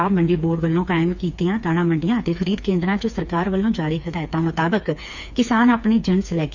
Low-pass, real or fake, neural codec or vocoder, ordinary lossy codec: 7.2 kHz; fake; codec, 16 kHz, 4 kbps, FreqCodec, smaller model; none